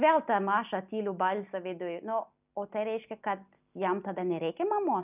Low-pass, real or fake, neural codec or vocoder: 3.6 kHz; real; none